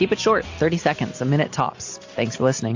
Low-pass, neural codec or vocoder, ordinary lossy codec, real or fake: 7.2 kHz; none; AAC, 48 kbps; real